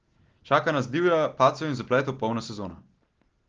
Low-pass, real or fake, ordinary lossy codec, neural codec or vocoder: 7.2 kHz; real; Opus, 16 kbps; none